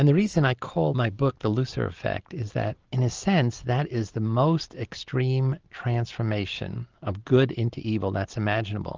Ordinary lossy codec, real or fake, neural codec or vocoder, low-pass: Opus, 24 kbps; real; none; 7.2 kHz